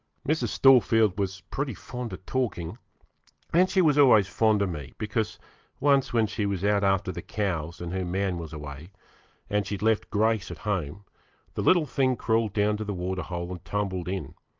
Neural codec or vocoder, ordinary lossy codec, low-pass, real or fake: none; Opus, 32 kbps; 7.2 kHz; real